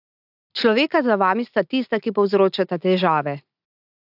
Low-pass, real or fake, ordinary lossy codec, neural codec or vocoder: 5.4 kHz; real; AAC, 48 kbps; none